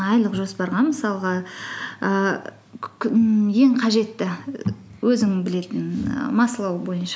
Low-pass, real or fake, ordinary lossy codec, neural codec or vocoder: none; real; none; none